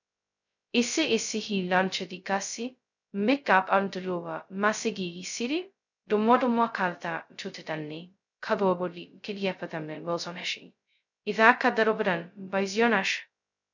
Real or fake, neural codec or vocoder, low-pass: fake; codec, 16 kHz, 0.2 kbps, FocalCodec; 7.2 kHz